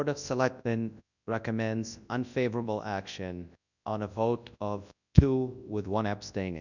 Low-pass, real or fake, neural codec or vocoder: 7.2 kHz; fake; codec, 24 kHz, 0.9 kbps, WavTokenizer, large speech release